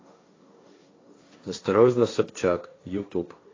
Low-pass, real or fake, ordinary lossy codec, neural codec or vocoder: 7.2 kHz; fake; AAC, 32 kbps; codec, 16 kHz, 1.1 kbps, Voila-Tokenizer